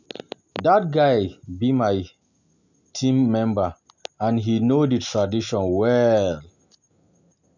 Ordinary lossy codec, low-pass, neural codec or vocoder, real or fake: none; 7.2 kHz; none; real